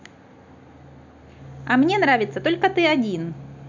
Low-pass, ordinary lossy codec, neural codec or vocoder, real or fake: 7.2 kHz; none; none; real